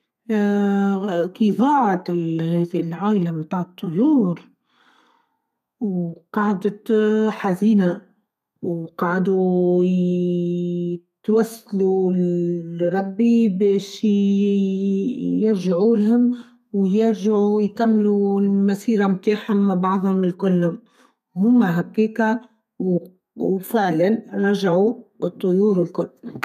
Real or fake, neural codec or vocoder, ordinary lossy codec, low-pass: fake; codec, 32 kHz, 1.9 kbps, SNAC; none; 14.4 kHz